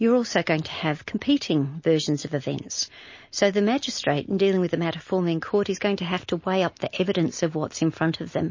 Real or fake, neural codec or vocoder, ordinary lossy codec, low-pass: real; none; MP3, 32 kbps; 7.2 kHz